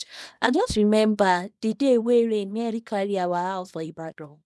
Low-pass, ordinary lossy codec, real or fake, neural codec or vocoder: none; none; fake; codec, 24 kHz, 0.9 kbps, WavTokenizer, small release